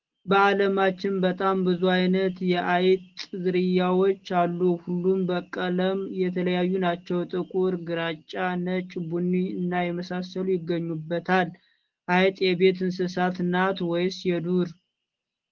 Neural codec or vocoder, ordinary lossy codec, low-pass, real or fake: none; Opus, 16 kbps; 7.2 kHz; real